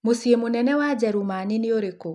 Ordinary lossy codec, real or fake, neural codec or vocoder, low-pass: none; real; none; 10.8 kHz